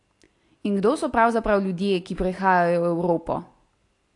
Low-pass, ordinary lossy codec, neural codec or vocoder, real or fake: 10.8 kHz; AAC, 48 kbps; none; real